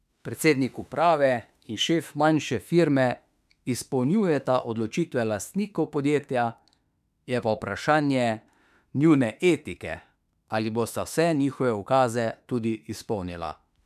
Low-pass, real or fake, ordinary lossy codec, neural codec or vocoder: 14.4 kHz; fake; none; autoencoder, 48 kHz, 32 numbers a frame, DAC-VAE, trained on Japanese speech